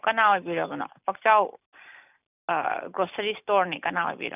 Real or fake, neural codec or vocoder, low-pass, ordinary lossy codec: real; none; 3.6 kHz; none